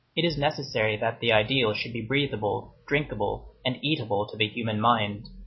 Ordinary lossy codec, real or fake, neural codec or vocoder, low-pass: MP3, 24 kbps; real; none; 7.2 kHz